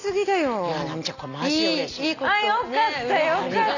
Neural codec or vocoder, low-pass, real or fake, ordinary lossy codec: none; 7.2 kHz; real; none